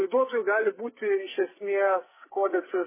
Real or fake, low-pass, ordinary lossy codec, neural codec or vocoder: fake; 3.6 kHz; MP3, 16 kbps; codec, 44.1 kHz, 2.6 kbps, SNAC